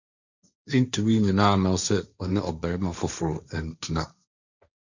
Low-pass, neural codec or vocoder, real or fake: 7.2 kHz; codec, 16 kHz, 1.1 kbps, Voila-Tokenizer; fake